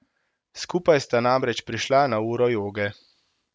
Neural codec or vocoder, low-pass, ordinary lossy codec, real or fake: none; none; none; real